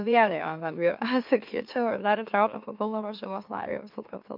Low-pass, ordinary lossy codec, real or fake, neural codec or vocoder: 5.4 kHz; MP3, 48 kbps; fake; autoencoder, 44.1 kHz, a latent of 192 numbers a frame, MeloTTS